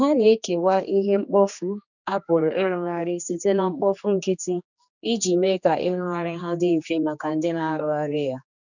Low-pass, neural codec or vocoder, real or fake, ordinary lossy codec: 7.2 kHz; codec, 16 kHz, 2 kbps, X-Codec, HuBERT features, trained on general audio; fake; none